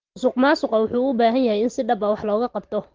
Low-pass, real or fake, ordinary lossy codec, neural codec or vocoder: 7.2 kHz; real; Opus, 16 kbps; none